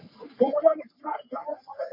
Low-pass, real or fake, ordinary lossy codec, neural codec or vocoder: 5.4 kHz; fake; MP3, 32 kbps; codec, 44.1 kHz, 3.4 kbps, Pupu-Codec